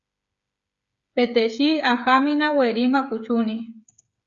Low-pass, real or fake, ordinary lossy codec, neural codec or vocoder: 7.2 kHz; fake; Opus, 64 kbps; codec, 16 kHz, 8 kbps, FreqCodec, smaller model